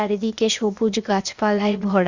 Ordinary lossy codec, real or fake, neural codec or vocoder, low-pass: Opus, 64 kbps; fake; codec, 16 kHz, 0.8 kbps, ZipCodec; 7.2 kHz